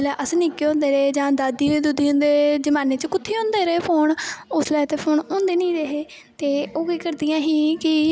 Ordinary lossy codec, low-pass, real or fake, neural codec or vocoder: none; none; real; none